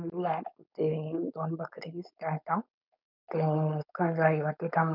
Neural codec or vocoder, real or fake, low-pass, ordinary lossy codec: codec, 16 kHz, 4.8 kbps, FACodec; fake; 5.4 kHz; none